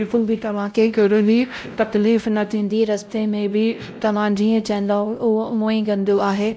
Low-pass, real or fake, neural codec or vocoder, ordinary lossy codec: none; fake; codec, 16 kHz, 0.5 kbps, X-Codec, WavLM features, trained on Multilingual LibriSpeech; none